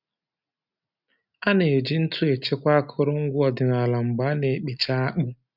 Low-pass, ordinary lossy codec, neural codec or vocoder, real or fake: 5.4 kHz; none; none; real